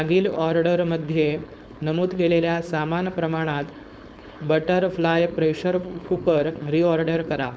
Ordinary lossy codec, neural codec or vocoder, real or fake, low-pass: none; codec, 16 kHz, 4.8 kbps, FACodec; fake; none